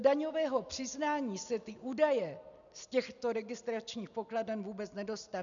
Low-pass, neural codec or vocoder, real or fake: 7.2 kHz; none; real